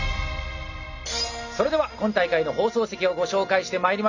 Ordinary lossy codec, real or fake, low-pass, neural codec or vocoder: none; real; 7.2 kHz; none